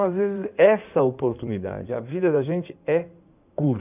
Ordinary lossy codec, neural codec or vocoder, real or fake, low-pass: none; codec, 16 kHz in and 24 kHz out, 2.2 kbps, FireRedTTS-2 codec; fake; 3.6 kHz